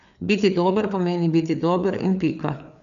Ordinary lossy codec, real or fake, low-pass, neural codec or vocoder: none; fake; 7.2 kHz; codec, 16 kHz, 4 kbps, FreqCodec, larger model